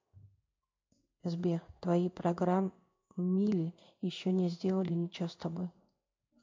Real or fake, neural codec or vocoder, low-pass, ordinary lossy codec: fake; codec, 16 kHz in and 24 kHz out, 1 kbps, XY-Tokenizer; 7.2 kHz; MP3, 48 kbps